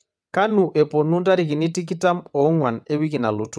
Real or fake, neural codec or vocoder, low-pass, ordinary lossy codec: fake; vocoder, 22.05 kHz, 80 mel bands, WaveNeXt; none; none